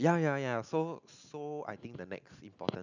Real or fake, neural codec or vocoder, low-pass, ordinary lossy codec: real; none; 7.2 kHz; none